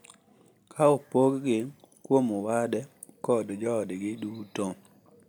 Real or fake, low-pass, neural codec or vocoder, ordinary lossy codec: real; none; none; none